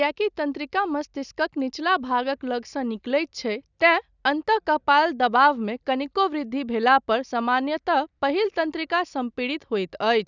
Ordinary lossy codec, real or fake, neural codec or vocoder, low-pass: none; real; none; 7.2 kHz